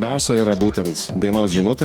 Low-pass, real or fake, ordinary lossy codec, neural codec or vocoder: 19.8 kHz; fake; Opus, 64 kbps; codec, 44.1 kHz, 2.6 kbps, DAC